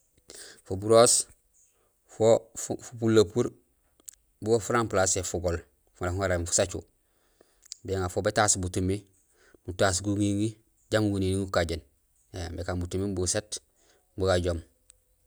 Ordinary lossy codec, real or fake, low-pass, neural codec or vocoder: none; real; none; none